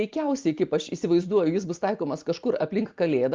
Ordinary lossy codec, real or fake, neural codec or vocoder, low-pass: Opus, 32 kbps; real; none; 7.2 kHz